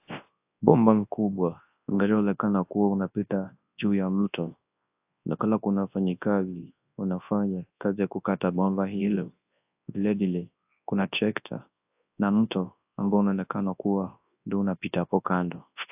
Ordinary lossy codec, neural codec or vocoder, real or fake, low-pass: AAC, 32 kbps; codec, 24 kHz, 0.9 kbps, WavTokenizer, large speech release; fake; 3.6 kHz